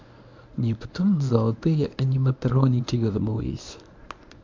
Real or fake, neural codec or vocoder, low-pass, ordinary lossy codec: fake; codec, 24 kHz, 0.9 kbps, WavTokenizer, medium speech release version 1; 7.2 kHz; MP3, 64 kbps